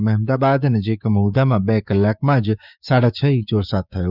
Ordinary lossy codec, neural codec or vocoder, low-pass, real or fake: none; codec, 16 kHz, 6 kbps, DAC; 5.4 kHz; fake